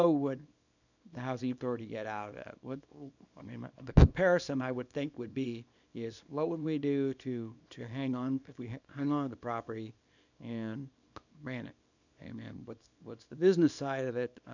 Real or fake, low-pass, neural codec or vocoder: fake; 7.2 kHz; codec, 24 kHz, 0.9 kbps, WavTokenizer, small release